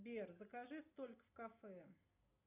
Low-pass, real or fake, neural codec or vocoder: 3.6 kHz; real; none